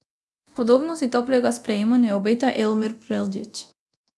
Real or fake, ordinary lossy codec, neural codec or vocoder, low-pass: fake; none; codec, 24 kHz, 0.9 kbps, DualCodec; none